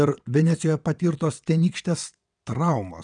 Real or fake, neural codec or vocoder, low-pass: fake; vocoder, 22.05 kHz, 80 mel bands, WaveNeXt; 9.9 kHz